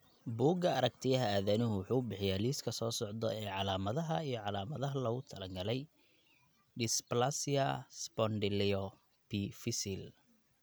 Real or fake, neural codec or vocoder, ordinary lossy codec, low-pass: real; none; none; none